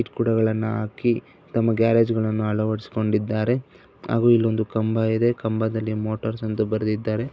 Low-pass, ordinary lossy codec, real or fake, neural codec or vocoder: none; none; real; none